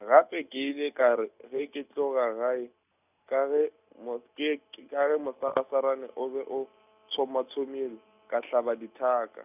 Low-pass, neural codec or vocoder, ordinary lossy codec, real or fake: 3.6 kHz; none; none; real